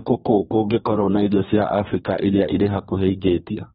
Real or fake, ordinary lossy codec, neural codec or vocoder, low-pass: fake; AAC, 16 kbps; codec, 32 kHz, 1.9 kbps, SNAC; 14.4 kHz